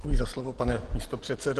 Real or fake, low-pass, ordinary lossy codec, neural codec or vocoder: real; 10.8 kHz; Opus, 16 kbps; none